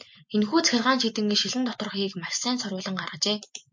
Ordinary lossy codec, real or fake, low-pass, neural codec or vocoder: MP3, 48 kbps; real; 7.2 kHz; none